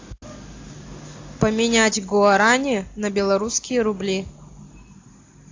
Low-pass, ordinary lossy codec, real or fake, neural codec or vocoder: 7.2 kHz; AAC, 48 kbps; real; none